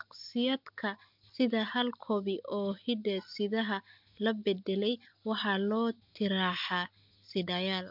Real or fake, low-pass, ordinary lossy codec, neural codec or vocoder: real; 5.4 kHz; MP3, 48 kbps; none